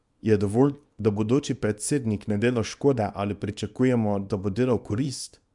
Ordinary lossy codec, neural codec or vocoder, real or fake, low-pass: none; codec, 24 kHz, 0.9 kbps, WavTokenizer, small release; fake; 10.8 kHz